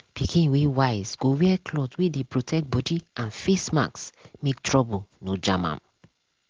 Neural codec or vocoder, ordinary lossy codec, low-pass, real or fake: none; Opus, 16 kbps; 7.2 kHz; real